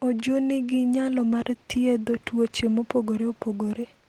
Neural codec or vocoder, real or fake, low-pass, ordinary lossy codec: none; real; 19.8 kHz; Opus, 16 kbps